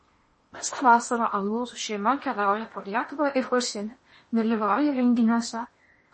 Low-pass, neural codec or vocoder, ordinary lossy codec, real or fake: 10.8 kHz; codec, 16 kHz in and 24 kHz out, 0.8 kbps, FocalCodec, streaming, 65536 codes; MP3, 32 kbps; fake